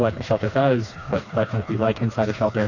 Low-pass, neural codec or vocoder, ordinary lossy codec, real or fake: 7.2 kHz; codec, 16 kHz, 2 kbps, FreqCodec, smaller model; AAC, 48 kbps; fake